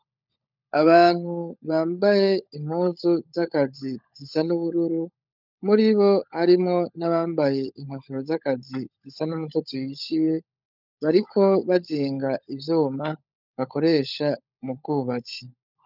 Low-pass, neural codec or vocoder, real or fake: 5.4 kHz; codec, 16 kHz, 16 kbps, FunCodec, trained on LibriTTS, 50 frames a second; fake